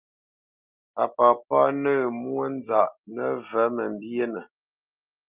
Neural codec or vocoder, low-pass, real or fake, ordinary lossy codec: none; 3.6 kHz; real; Opus, 32 kbps